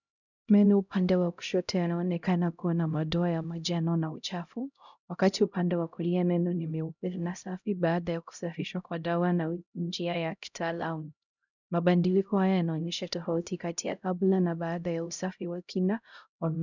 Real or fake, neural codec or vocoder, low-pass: fake; codec, 16 kHz, 0.5 kbps, X-Codec, HuBERT features, trained on LibriSpeech; 7.2 kHz